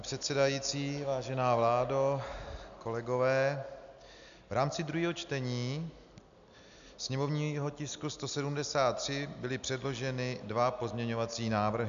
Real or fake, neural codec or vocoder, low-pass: real; none; 7.2 kHz